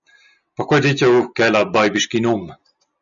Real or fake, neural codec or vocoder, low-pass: real; none; 7.2 kHz